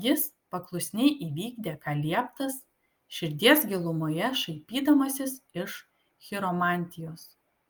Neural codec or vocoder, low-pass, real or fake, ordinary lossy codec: none; 19.8 kHz; real; Opus, 32 kbps